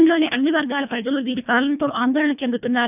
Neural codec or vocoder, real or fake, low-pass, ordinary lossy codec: codec, 24 kHz, 1.5 kbps, HILCodec; fake; 3.6 kHz; none